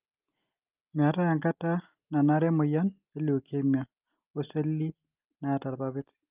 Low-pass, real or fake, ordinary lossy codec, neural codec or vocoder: 3.6 kHz; real; Opus, 24 kbps; none